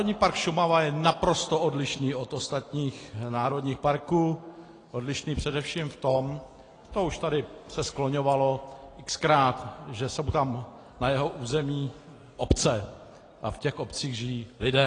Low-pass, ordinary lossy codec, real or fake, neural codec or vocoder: 9.9 kHz; AAC, 32 kbps; real; none